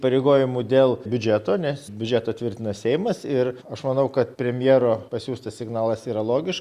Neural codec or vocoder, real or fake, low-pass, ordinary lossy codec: none; real; 14.4 kHz; Opus, 64 kbps